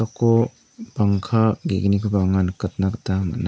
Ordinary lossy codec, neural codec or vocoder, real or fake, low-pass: none; none; real; none